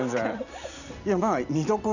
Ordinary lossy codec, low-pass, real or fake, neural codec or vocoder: none; 7.2 kHz; real; none